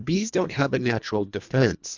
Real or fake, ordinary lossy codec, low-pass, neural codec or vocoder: fake; Opus, 64 kbps; 7.2 kHz; codec, 24 kHz, 1.5 kbps, HILCodec